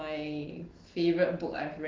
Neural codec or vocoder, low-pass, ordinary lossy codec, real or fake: none; 7.2 kHz; Opus, 16 kbps; real